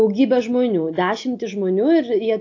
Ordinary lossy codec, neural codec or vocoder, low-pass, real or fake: AAC, 48 kbps; none; 7.2 kHz; real